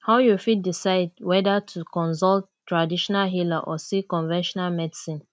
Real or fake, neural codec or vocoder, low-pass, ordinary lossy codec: real; none; none; none